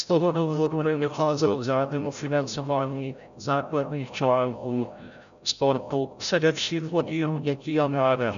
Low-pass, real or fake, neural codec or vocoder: 7.2 kHz; fake; codec, 16 kHz, 0.5 kbps, FreqCodec, larger model